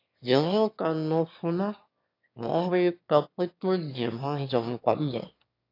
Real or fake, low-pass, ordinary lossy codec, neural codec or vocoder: fake; 5.4 kHz; AAC, 32 kbps; autoencoder, 22.05 kHz, a latent of 192 numbers a frame, VITS, trained on one speaker